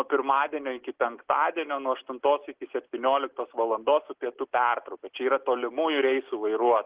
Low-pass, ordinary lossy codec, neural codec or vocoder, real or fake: 3.6 kHz; Opus, 16 kbps; none; real